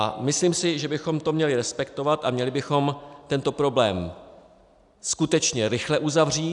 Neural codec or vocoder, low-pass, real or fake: none; 10.8 kHz; real